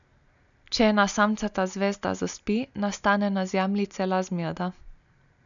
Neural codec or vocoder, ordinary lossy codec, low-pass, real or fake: none; none; 7.2 kHz; real